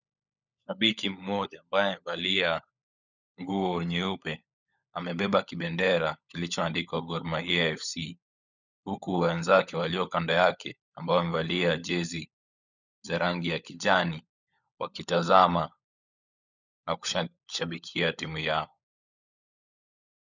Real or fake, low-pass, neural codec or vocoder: fake; 7.2 kHz; codec, 16 kHz, 16 kbps, FunCodec, trained on LibriTTS, 50 frames a second